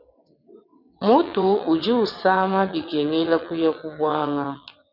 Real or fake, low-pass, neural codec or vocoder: fake; 5.4 kHz; vocoder, 22.05 kHz, 80 mel bands, WaveNeXt